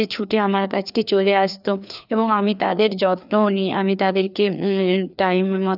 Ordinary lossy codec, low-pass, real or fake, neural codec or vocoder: none; 5.4 kHz; fake; codec, 16 kHz, 2 kbps, FreqCodec, larger model